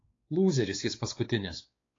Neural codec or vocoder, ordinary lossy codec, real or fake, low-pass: codec, 16 kHz, 4 kbps, X-Codec, WavLM features, trained on Multilingual LibriSpeech; AAC, 32 kbps; fake; 7.2 kHz